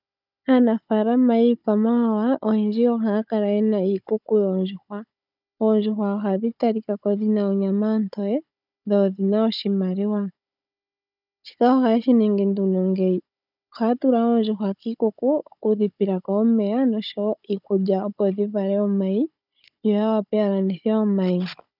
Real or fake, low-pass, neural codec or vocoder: fake; 5.4 kHz; codec, 16 kHz, 16 kbps, FunCodec, trained on Chinese and English, 50 frames a second